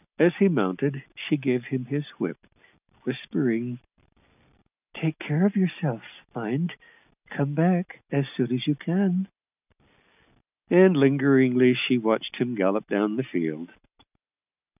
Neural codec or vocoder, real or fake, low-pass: none; real; 3.6 kHz